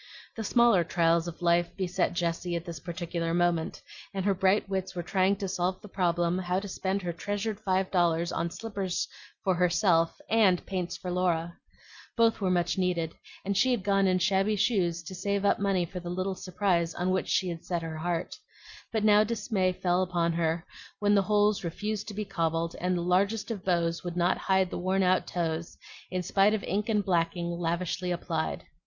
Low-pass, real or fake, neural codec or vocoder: 7.2 kHz; real; none